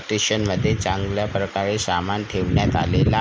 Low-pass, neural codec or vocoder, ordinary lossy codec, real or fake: none; none; none; real